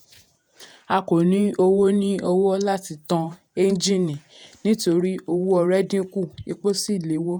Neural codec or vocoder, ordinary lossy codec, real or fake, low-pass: vocoder, 48 kHz, 128 mel bands, Vocos; none; fake; none